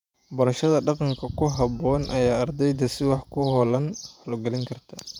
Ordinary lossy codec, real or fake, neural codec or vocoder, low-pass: none; fake; vocoder, 48 kHz, 128 mel bands, Vocos; 19.8 kHz